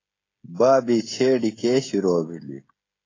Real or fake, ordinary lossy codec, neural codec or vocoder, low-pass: fake; AAC, 32 kbps; codec, 16 kHz, 16 kbps, FreqCodec, smaller model; 7.2 kHz